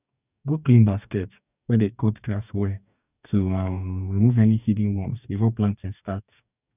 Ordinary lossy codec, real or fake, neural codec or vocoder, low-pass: none; fake; codec, 44.1 kHz, 2.6 kbps, DAC; 3.6 kHz